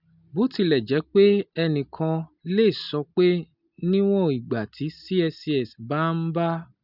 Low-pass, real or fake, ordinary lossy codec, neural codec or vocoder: 5.4 kHz; real; none; none